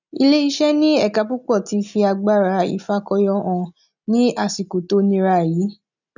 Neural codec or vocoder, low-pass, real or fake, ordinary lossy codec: none; 7.2 kHz; real; none